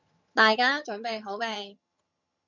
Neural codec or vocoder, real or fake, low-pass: vocoder, 22.05 kHz, 80 mel bands, HiFi-GAN; fake; 7.2 kHz